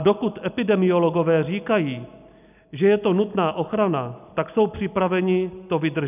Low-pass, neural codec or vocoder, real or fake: 3.6 kHz; none; real